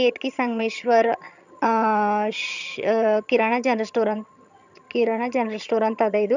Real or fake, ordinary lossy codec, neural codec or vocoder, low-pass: fake; none; vocoder, 22.05 kHz, 80 mel bands, HiFi-GAN; 7.2 kHz